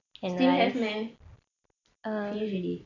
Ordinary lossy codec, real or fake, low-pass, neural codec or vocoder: none; real; 7.2 kHz; none